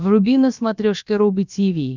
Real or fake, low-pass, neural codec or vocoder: fake; 7.2 kHz; codec, 16 kHz, about 1 kbps, DyCAST, with the encoder's durations